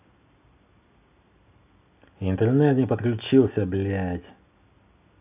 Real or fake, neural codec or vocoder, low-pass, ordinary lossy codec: real; none; 3.6 kHz; AAC, 32 kbps